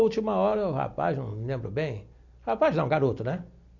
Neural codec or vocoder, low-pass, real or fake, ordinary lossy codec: none; 7.2 kHz; real; none